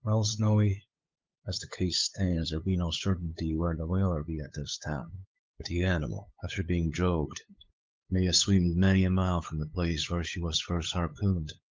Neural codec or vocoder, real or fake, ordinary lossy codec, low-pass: codec, 16 kHz, 8 kbps, FunCodec, trained on LibriTTS, 25 frames a second; fake; Opus, 24 kbps; 7.2 kHz